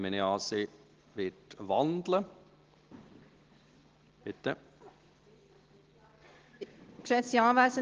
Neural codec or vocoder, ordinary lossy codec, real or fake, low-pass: none; Opus, 16 kbps; real; 7.2 kHz